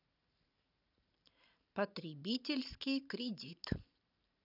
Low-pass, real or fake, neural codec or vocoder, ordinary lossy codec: 5.4 kHz; fake; vocoder, 44.1 kHz, 128 mel bands every 512 samples, BigVGAN v2; none